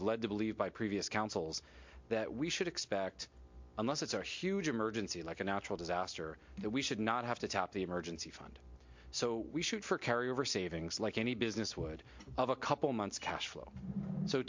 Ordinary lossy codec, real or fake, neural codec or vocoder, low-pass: MP3, 48 kbps; real; none; 7.2 kHz